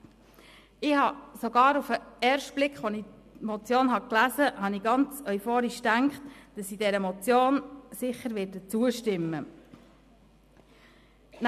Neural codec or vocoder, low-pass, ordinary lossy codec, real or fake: none; 14.4 kHz; MP3, 96 kbps; real